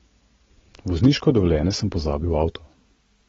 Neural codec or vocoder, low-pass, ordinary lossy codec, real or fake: none; 7.2 kHz; AAC, 24 kbps; real